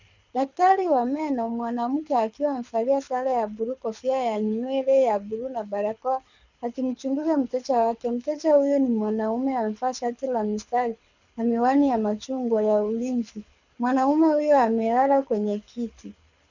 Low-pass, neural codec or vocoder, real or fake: 7.2 kHz; codec, 24 kHz, 6 kbps, HILCodec; fake